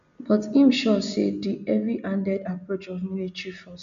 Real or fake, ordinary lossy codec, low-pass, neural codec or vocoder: real; none; 7.2 kHz; none